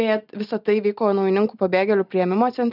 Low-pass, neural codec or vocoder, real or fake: 5.4 kHz; none; real